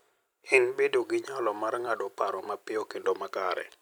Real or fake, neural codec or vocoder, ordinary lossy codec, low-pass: fake; vocoder, 44.1 kHz, 128 mel bands every 256 samples, BigVGAN v2; none; none